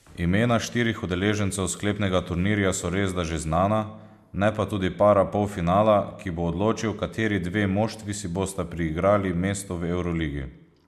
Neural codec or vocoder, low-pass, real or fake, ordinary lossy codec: none; 14.4 kHz; real; MP3, 96 kbps